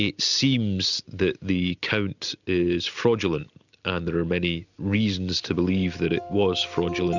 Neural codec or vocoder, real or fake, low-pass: none; real; 7.2 kHz